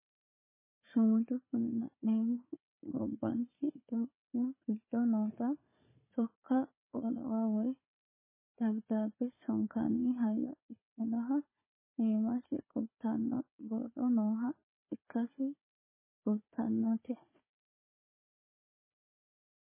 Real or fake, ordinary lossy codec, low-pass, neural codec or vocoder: fake; MP3, 16 kbps; 3.6 kHz; codec, 16 kHz, 4 kbps, FunCodec, trained on Chinese and English, 50 frames a second